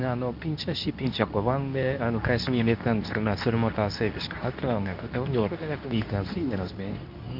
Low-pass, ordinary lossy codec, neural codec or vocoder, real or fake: 5.4 kHz; none; codec, 24 kHz, 0.9 kbps, WavTokenizer, medium speech release version 2; fake